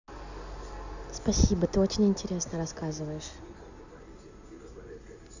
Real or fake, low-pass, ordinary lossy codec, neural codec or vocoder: real; 7.2 kHz; none; none